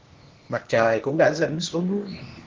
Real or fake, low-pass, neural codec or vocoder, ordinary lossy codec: fake; 7.2 kHz; codec, 16 kHz, 0.8 kbps, ZipCodec; Opus, 16 kbps